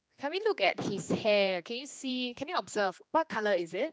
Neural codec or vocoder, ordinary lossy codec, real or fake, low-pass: codec, 16 kHz, 2 kbps, X-Codec, HuBERT features, trained on general audio; none; fake; none